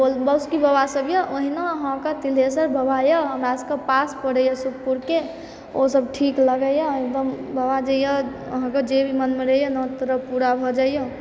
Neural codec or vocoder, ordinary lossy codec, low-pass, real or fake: none; none; none; real